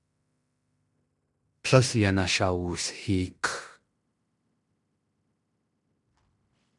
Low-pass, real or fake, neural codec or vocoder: 10.8 kHz; fake; codec, 16 kHz in and 24 kHz out, 0.9 kbps, LongCat-Audio-Codec, fine tuned four codebook decoder